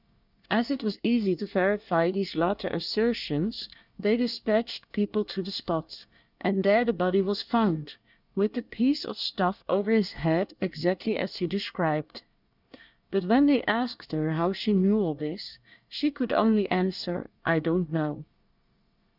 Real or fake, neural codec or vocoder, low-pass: fake; codec, 24 kHz, 1 kbps, SNAC; 5.4 kHz